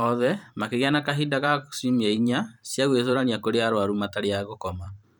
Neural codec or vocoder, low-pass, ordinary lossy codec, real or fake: vocoder, 44.1 kHz, 128 mel bands every 512 samples, BigVGAN v2; 19.8 kHz; none; fake